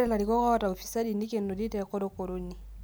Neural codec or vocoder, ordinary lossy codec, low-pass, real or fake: none; none; none; real